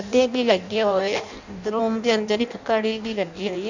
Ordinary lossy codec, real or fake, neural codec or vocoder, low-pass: none; fake; codec, 16 kHz in and 24 kHz out, 0.6 kbps, FireRedTTS-2 codec; 7.2 kHz